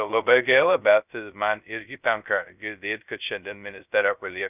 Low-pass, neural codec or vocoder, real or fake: 3.6 kHz; codec, 16 kHz, 0.2 kbps, FocalCodec; fake